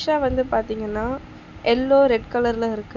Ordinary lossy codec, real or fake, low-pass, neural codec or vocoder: none; real; 7.2 kHz; none